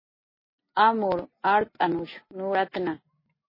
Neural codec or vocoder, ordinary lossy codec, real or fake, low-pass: none; MP3, 24 kbps; real; 5.4 kHz